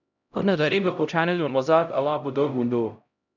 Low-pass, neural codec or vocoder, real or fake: 7.2 kHz; codec, 16 kHz, 0.5 kbps, X-Codec, HuBERT features, trained on LibriSpeech; fake